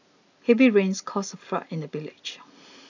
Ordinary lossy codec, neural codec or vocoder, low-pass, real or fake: none; none; 7.2 kHz; real